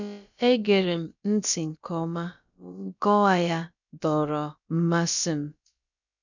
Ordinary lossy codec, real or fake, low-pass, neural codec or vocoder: none; fake; 7.2 kHz; codec, 16 kHz, about 1 kbps, DyCAST, with the encoder's durations